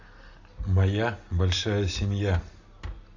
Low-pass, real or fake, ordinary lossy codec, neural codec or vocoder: 7.2 kHz; real; AAC, 48 kbps; none